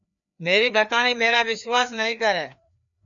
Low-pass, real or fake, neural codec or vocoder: 7.2 kHz; fake; codec, 16 kHz, 2 kbps, FreqCodec, larger model